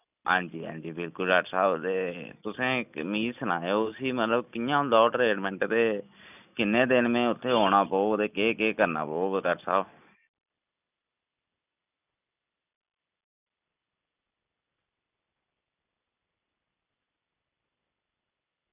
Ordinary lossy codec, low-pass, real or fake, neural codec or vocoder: none; 3.6 kHz; real; none